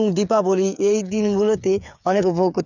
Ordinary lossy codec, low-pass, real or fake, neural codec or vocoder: none; 7.2 kHz; fake; codec, 16 kHz, 8 kbps, FreqCodec, smaller model